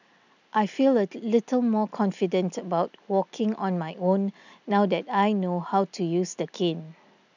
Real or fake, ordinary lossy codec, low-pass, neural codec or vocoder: real; none; 7.2 kHz; none